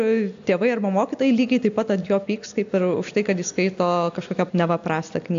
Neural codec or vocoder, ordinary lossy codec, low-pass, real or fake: none; AAC, 64 kbps; 7.2 kHz; real